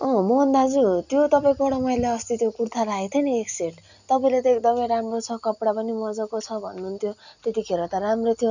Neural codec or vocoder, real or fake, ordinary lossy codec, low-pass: none; real; none; 7.2 kHz